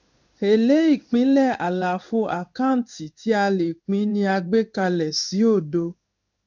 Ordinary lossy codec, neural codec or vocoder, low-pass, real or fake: none; codec, 16 kHz in and 24 kHz out, 1 kbps, XY-Tokenizer; 7.2 kHz; fake